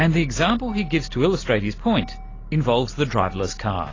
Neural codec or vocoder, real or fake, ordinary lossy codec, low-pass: none; real; AAC, 32 kbps; 7.2 kHz